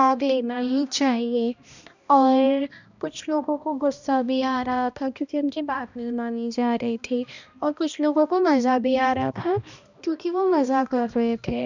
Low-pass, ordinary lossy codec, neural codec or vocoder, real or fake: 7.2 kHz; none; codec, 16 kHz, 1 kbps, X-Codec, HuBERT features, trained on balanced general audio; fake